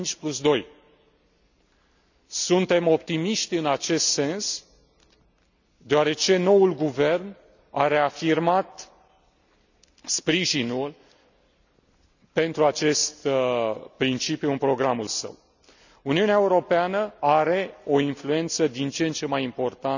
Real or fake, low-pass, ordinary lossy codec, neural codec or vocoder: real; 7.2 kHz; none; none